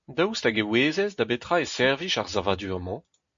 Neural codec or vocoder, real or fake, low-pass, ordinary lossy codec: none; real; 7.2 kHz; MP3, 48 kbps